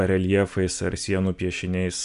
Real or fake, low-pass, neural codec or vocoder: real; 10.8 kHz; none